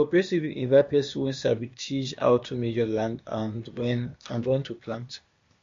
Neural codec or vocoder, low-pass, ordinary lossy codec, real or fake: codec, 16 kHz, 0.8 kbps, ZipCodec; 7.2 kHz; MP3, 48 kbps; fake